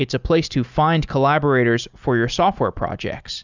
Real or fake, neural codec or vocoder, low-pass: real; none; 7.2 kHz